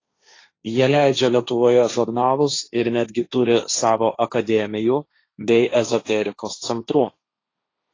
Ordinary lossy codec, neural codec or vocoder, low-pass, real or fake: AAC, 32 kbps; codec, 16 kHz, 1.1 kbps, Voila-Tokenizer; 7.2 kHz; fake